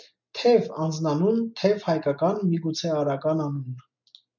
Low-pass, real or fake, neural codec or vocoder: 7.2 kHz; real; none